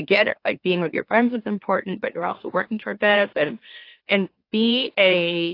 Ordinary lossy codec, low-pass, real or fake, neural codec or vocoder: AAC, 32 kbps; 5.4 kHz; fake; autoencoder, 44.1 kHz, a latent of 192 numbers a frame, MeloTTS